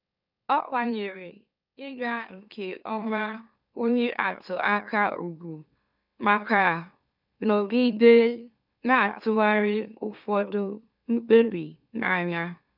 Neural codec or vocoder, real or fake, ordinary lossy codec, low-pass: autoencoder, 44.1 kHz, a latent of 192 numbers a frame, MeloTTS; fake; none; 5.4 kHz